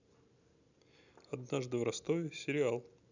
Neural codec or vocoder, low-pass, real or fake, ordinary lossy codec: none; 7.2 kHz; real; none